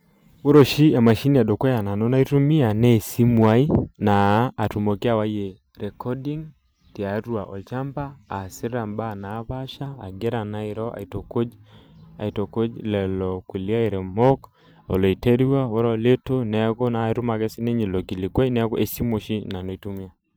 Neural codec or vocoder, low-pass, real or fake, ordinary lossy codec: vocoder, 44.1 kHz, 128 mel bands every 512 samples, BigVGAN v2; none; fake; none